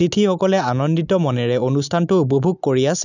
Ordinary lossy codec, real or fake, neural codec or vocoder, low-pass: none; real; none; 7.2 kHz